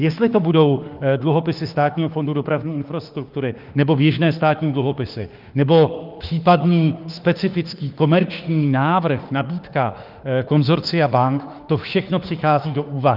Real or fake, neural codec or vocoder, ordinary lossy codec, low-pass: fake; autoencoder, 48 kHz, 32 numbers a frame, DAC-VAE, trained on Japanese speech; Opus, 24 kbps; 5.4 kHz